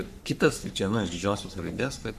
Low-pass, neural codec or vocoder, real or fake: 14.4 kHz; codec, 44.1 kHz, 3.4 kbps, Pupu-Codec; fake